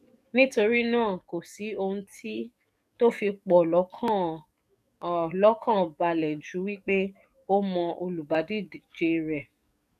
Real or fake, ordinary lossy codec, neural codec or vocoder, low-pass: fake; none; codec, 44.1 kHz, 7.8 kbps, DAC; 14.4 kHz